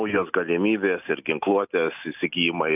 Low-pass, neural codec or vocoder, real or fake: 3.6 kHz; none; real